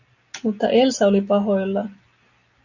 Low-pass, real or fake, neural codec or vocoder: 7.2 kHz; real; none